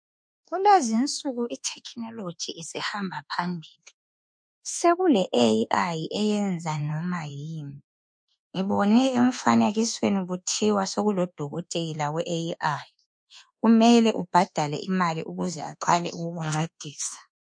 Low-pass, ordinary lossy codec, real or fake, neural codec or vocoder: 9.9 kHz; MP3, 48 kbps; fake; codec, 24 kHz, 1.2 kbps, DualCodec